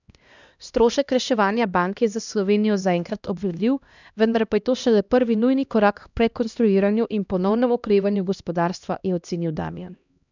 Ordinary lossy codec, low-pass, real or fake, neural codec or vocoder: none; 7.2 kHz; fake; codec, 16 kHz, 1 kbps, X-Codec, HuBERT features, trained on LibriSpeech